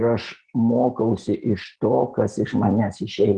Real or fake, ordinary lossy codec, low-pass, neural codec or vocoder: real; Opus, 16 kbps; 9.9 kHz; none